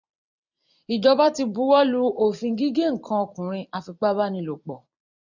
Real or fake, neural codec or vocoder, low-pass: fake; vocoder, 44.1 kHz, 128 mel bands every 256 samples, BigVGAN v2; 7.2 kHz